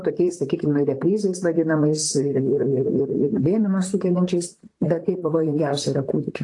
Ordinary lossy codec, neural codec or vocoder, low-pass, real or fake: AAC, 48 kbps; vocoder, 44.1 kHz, 128 mel bands, Pupu-Vocoder; 10.8 kHz; fake